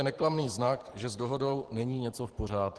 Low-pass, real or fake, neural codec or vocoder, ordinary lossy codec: 10.8 kHz; fake; vocoder, 44.1 kHz, 128 mel bands every 512 samples, BigVGAN v2; Opus, 16 kbps